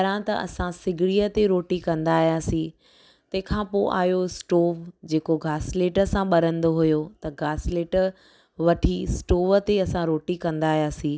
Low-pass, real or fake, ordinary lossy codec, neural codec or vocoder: none; real; none; none